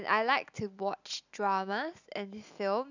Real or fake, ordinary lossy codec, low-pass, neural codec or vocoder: real; none; 7.2 kHz; none